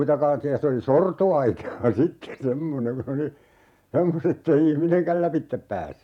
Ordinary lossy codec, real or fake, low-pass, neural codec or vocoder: none; fake; 19.8 kHz; vocoder, 48 kHz, 128 mel bands, Vocos